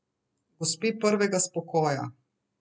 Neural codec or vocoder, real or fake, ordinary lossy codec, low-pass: none; real; none; none